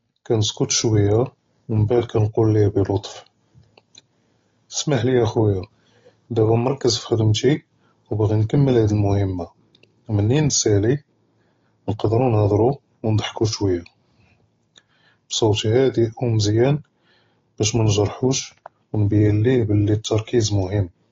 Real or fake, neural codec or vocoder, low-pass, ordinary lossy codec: real; none; 7.2 kHz; AAC, 32 kbps